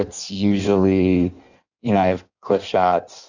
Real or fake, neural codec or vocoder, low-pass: fake; codec, 16 kHz in and 24 kHz out, 1.1 kbps, FireRedTTS-2 codec; 7.2 kHz